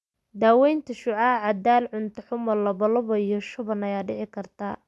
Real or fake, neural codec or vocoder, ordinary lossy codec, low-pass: real; none; none; none